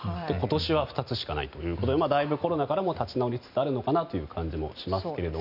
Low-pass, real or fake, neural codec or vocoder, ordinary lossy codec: 5.4 kHz; real; none; none